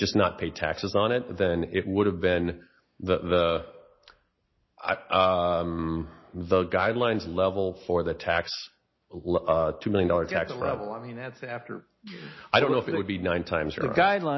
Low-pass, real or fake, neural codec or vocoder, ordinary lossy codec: 7.2 kHz; real; none; MP3, 24 kbps